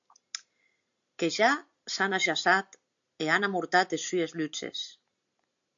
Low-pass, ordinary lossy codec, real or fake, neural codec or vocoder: 7.2 kHz; AAC, 64 kbps; real; none